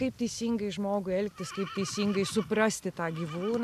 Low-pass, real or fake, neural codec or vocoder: 14.4 kHz; real; none